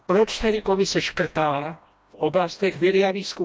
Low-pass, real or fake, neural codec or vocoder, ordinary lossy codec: none; fake; codec, 16 kHz, 1 kbps, FreqCodec, smaller model; none